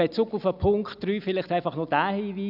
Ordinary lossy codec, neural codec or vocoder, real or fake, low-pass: none; none; real; 5.4 kHz